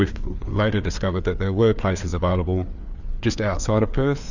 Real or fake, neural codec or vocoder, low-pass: fake; codec, 16 kHz, 4 kbps, FreqCodec, larger model; 7.2 kHz